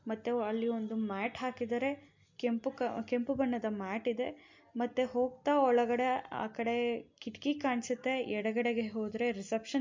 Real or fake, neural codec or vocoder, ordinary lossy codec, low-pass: real; none; MP3, 48 kbps; 7.2 kHz